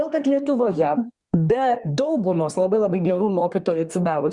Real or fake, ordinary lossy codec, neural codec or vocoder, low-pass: fake; Opus, 64 kbps; codec, 24 kHz, 1 kbps, SNAC; 10.8 kHz